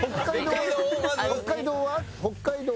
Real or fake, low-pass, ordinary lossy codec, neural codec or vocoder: real; none; none; none